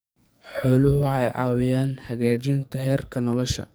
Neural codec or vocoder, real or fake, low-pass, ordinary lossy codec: codec, 44.1 kHz, 2.6 kbps, SNAC; fake; none; none